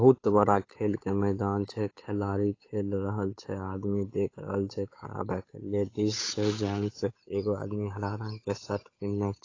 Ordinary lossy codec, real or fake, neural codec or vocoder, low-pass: AAC, 32 kbps; fake; codec, 16 kHz, 8 kbps, FunCodec, trained on Chinese and English, 25 frames a second; 7.2 kHz